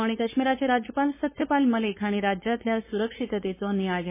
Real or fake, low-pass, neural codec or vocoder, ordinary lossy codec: fake; 3.6 kHz; codec, 16 kHz, 4.8 kbps, FACodec; MP3, 16 kbps